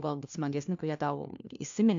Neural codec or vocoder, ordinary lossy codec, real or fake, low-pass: codec, 16 kHz, 1 kbps, FunCodec, trained on LibriTTS, 50 frames a second; AAC, 64 kbps; fake; 7.2 kHz